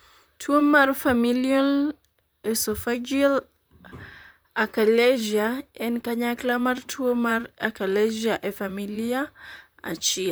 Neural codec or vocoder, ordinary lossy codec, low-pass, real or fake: vocoder, 44.1 kHz, 128 mel bands, Pupu-Vocoder; none; none; fake